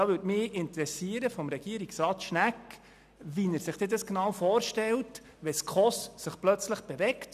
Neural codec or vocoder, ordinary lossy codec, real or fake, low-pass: none; none; real; 14.4 kHz